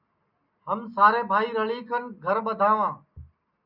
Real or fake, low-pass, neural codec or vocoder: real; 5.4 kHz; none